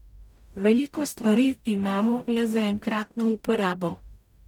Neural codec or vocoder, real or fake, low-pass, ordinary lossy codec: codec, 44.1 kHz, 0.9 kbps, DAC; fake; 19.8 kHz; none